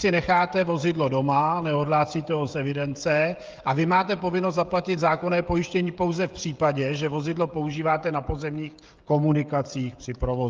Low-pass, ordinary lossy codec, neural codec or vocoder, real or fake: 7.2 kHz; Opus, 32 kbps; codec, 16 kHz, 16 kbps, FreqCodec, smaller model; fake